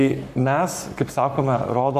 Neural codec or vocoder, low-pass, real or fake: codec, 44.1 kHz, 7.8 kbps, Pupu-Codec; 14.4 kHz; fake